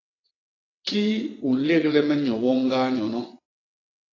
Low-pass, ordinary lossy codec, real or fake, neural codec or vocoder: 7.2 kHz; AAC, 32 kbps; fake; vocoder, 22.05 kHz, 80 mel bands, WaveNeXt